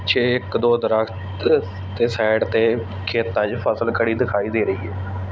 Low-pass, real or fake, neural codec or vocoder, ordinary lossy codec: none; real; none; none